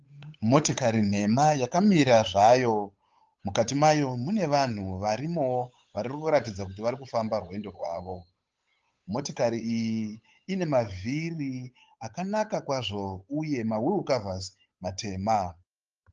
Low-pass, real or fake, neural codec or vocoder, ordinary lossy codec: 7.2 kHz; fake; codec, 16 kHz, 8 kbps, FunCodec, trained on Chinese and English, 25 frames a second; Opus, 24 kbps